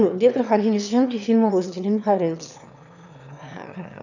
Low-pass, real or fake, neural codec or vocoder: 7.2 kHz; fake; autoencoder, 22.05 kHz, a latent of 192 numbers a frame, VITS, trained on one speaker